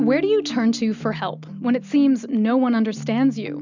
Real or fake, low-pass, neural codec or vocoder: real; 7.2 kHz; none